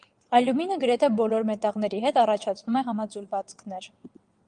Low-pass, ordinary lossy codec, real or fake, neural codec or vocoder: 9.9 kHz; Opus, 32 kbps; fake; vocoder, 22.05 kHz, 80 mel bands, WaveNeXt